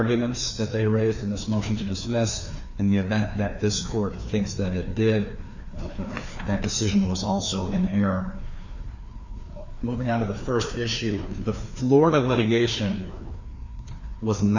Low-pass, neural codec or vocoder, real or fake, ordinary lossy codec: 7.2 kHz; codec, 16 kHz, 2 kbps, FreqCodec, larger model; fake; Opus, 64 kbps